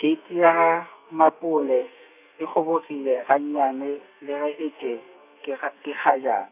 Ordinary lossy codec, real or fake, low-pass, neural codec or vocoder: none; fake; 3.6 kHz; codec, 32 kHz, 1.9 kbps, SNAC